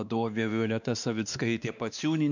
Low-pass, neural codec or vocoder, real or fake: 7.2 kHz; codec, 16 kHz, 2 kbps, X-Codec, WavLM features, trained on Multilingual LibriSpeech; fake